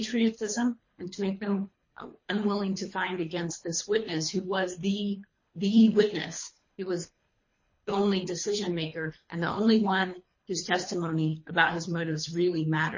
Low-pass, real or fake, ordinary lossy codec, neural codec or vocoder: 7.2 kHz; fake; MP3, 32 kbps; codec, 24 kHz, 3 kbps, HILCodec